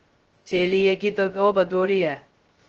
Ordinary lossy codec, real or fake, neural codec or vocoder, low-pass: Opus, 16 kbps; fake; codec, 16 kHz, 0.2 kbps, FocalCodec; 7.2 kHz